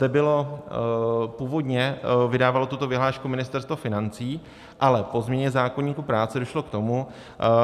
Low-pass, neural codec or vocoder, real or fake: 14.4 kHz; none; real